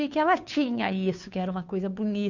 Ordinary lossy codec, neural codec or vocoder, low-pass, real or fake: none; codec, 16 kHz, 2 kbps, FunCodec, trained on Chinese and English, 25 frames a second; 7.2 kHz; fake